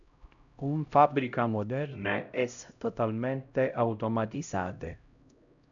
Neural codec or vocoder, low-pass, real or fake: codec, 16 kHz, 0.5 kbps, X-Codec, HuBERT features, trained on LibriSpeech; 7.2 kHz; fake